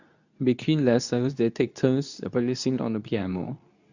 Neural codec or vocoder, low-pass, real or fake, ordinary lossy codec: codec, 24 kHz, 0.9 kbps, WavTokenizer, medium speech release version 2; 7.2 kHz; fake; none